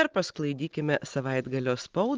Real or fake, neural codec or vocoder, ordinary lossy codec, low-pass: real; none; Opus, 16 kbps; 7.2 kHz